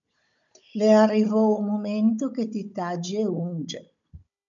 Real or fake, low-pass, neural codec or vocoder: fake; 7.2 kHz; codec, 16 kHz, 16 kbps, FunCodec, trained on Chinese and English, 50 frames a second